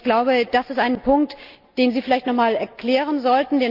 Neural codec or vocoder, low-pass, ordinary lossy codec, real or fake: none; 5.4 kHz; Opus, 24 kbps; real